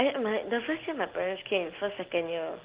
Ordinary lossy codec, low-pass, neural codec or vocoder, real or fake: Opus, 16 kbps; 3.6 kHz; none; real